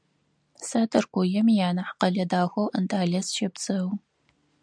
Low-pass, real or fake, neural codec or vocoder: 9.9 kHz; real; none